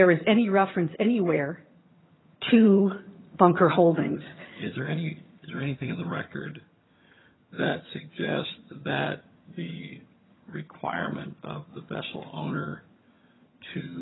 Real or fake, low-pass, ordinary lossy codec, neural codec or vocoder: fake; 7.2 kHz; AAC, 16 kbps; vocoder, 22.05 kHz, 80 mel bands, HiFi-GAN